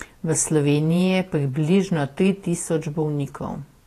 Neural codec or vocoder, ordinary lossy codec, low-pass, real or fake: vocoder, 48 kHz, 128 mel bands, Vocos; AAC, 48 kbps; 14.4 kHz; fake